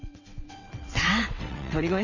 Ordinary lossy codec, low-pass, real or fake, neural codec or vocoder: none; 7.2 kHz; fake; vocoder, 22.05 kHz, 80 mel bands, WaveNeXt